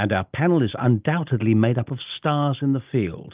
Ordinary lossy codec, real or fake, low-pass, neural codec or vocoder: Opus, 24 kbps; real; 3.6 kHz; none